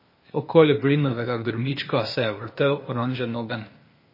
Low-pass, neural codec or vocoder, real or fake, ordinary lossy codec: 5.4 kHz; codec, 16 kHz, 0.8 kbps, ZipCodec; fake; MP3, 24 kbps